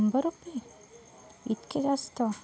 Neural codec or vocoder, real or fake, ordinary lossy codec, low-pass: none; real; none; none